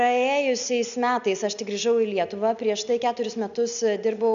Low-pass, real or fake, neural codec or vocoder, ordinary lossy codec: 7.2 kHz; real; none; MP3, 96 kbps